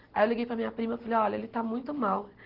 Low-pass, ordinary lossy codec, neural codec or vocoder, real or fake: 5.4 kHz; Opus, 16 kbps; none; real